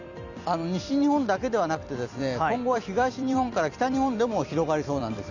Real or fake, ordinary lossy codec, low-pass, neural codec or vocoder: fake; none; 7.2 kHz; vocoder, 44.1 kHz, 128 mel bands every 256 samples, BigVGAN v2